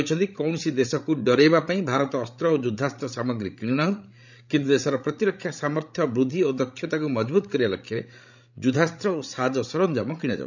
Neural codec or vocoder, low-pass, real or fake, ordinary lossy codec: codec, 16 kHz, 16 kbps, FreqCodec, larger model; 7.2 kHz; fake; none